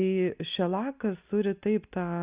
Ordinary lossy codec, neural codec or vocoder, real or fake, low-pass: Opus, 64 kbps; none; real; 3.6 kHz